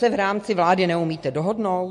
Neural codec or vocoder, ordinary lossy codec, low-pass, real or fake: none; MP3, 48 kbps; 14.4 kHz; real